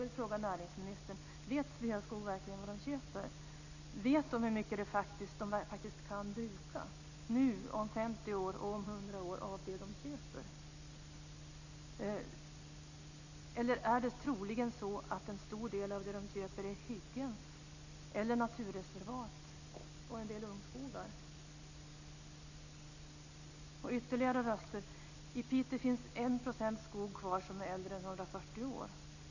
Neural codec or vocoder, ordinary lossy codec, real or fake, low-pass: none; none; real; 7.2 kHz